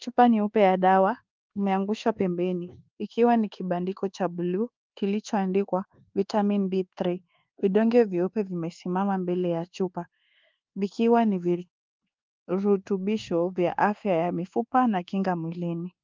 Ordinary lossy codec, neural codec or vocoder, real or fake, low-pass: Opus, 32 kbps; codec, 24 kHz, 1.2 kbps, DualCodec; fake; 7.2 kHz